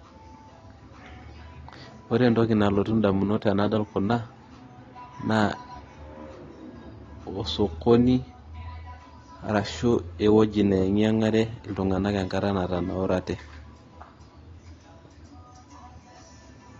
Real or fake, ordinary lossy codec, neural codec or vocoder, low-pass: real; AAC, 32 kbps; none; 7.2 kHz